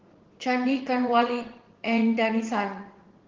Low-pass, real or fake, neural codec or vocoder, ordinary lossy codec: 7.2 kHz; fake; vocoder, 44.1 kHz, 80 mel bands, Vocos; Opus, 16 kbps